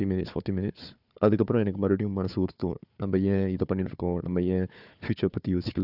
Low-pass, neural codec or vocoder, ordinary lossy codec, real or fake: 5.4 kHz; codec, 16 kHz, 4 kbps, FunCodec, trained on Chinese and English, 50 frames a second; none; fake